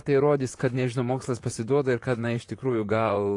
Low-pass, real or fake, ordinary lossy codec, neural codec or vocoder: 10.8 kHz; fake; AAC, 48 kbps; vocoder, 44.1 kHz, 128 mel bands, Pupu-Vocoder